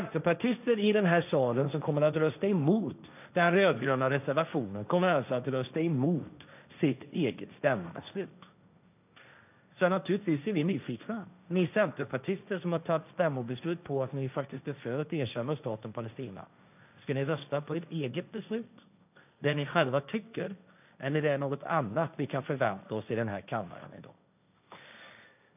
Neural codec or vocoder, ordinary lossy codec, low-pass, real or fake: codec, 16 kHz, 1.1 kbps, Voila-Tokenizer; none; 3.6 kHz; fake